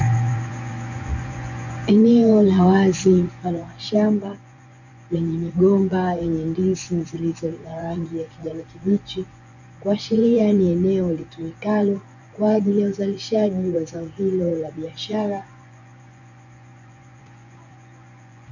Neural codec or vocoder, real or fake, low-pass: vocoder, 24 kHz, 100 mel bands, Vocos; fake; 7.2 kHz